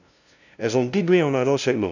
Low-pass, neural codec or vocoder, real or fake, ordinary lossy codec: 7.2 kHz; codec, 16 kHz, 0.5 kbps, FunCodec, trained on LibriTTS, 25 frames a second; fake; none